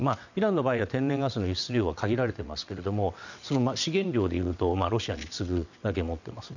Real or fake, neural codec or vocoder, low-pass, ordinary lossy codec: fake; vocoder, 22.05 kHz, 80 mel bands, WaveNeXt; 7.2 kHz; none